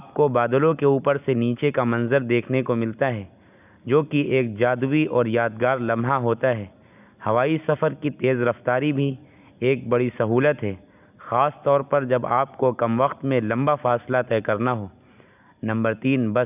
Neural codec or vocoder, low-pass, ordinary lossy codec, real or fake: none; 3.6 kHz; none; real